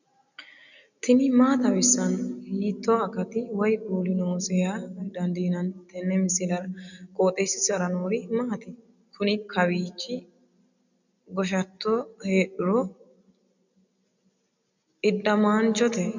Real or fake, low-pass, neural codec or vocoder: real; 7.2 kHz; none